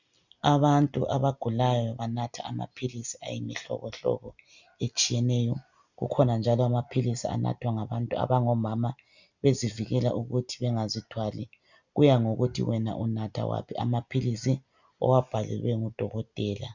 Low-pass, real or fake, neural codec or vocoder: 7.2 kHz; real; none